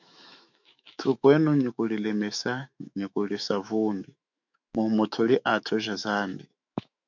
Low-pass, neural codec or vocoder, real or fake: 7.2 kHz; autoencoder, 48 kHz, 128 numbers a frame, DAC-VAE, trained on Japanese speech; fake